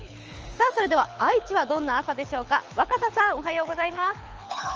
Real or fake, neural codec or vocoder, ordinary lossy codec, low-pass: fake; codec, 24 kHz, 6 kbps, HILCodec; Opus, 24 kbps; 7.2 kHz